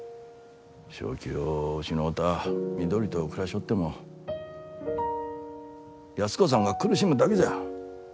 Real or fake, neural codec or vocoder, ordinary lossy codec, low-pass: real; none; none; none